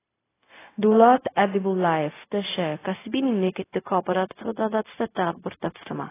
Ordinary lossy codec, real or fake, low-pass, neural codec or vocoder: AAC, 16 kbps; fake; 3.6 kHz; codec, 16 kHz, 0.4 kbps, LongCat-Audio-Codec